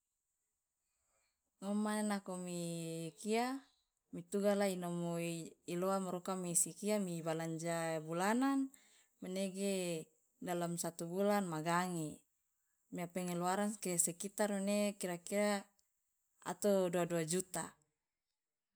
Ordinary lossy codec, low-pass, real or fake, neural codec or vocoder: none; none; real; none